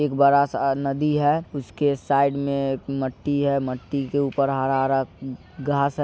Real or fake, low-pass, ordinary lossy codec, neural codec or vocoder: real; none; none; none